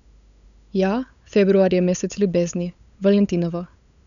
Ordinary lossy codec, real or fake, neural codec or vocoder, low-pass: none; fake; codec, 16 kHz, 8 kbps, FunCodec, trained on LibriTTS, 25 frames a second; 7.2 kHz